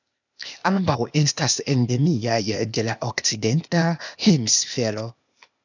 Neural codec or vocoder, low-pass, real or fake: codec, 16 kHz, 0.8 kbps, ZipCodec; 7.2 kHz; fake